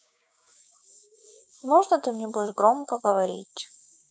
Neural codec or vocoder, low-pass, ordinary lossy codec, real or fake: codec, 16 kHz, 6 kbps, DAC; none; none; fake